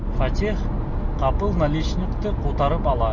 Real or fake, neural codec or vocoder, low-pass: real; none; 7.2 kHz